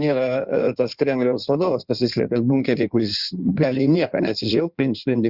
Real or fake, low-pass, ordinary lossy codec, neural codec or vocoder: fake; 5.4 kHz; Opus, 64 kbps; codec, 16 kHz in and 24 kHz out, 1.1 kbps, FireRedTTS-2 codec